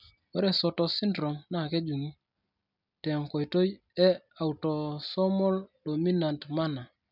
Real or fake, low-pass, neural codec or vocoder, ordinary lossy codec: real; 5.4 kHz; none; none